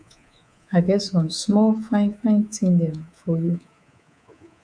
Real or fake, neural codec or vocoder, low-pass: fake; codec, 24 kHz, 3.1 kbps, DualCodec; 9.9 kHz